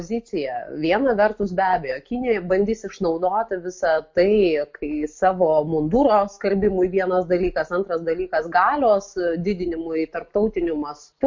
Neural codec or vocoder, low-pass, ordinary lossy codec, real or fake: none; 7.2 kHz; MP3, 48 kbps; real